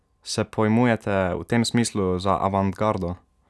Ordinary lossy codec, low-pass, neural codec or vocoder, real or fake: none; none; none; real